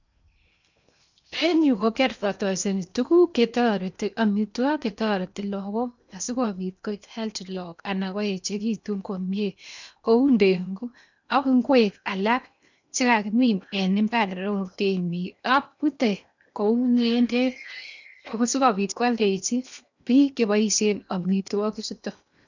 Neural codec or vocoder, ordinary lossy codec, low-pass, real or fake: codec, 16 kHz in and 24 kHz out, 0.8 kbps, FocalCodec, streaming, 65536 codes; none; 7.2 kHz; fake